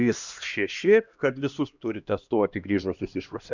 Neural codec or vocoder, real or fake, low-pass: codec, 16 kHz, 1 kbps, X-Codec, HuBERT features, trained on LibriSpeech; fake; 7.2 kHz